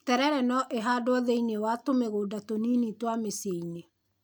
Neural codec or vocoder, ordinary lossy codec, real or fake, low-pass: none; none; real; none